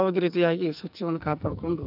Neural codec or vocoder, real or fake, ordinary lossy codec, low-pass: codec, 44.1 kHz, 2.6 kbps, SNAC; fake; none; 5.4 kHz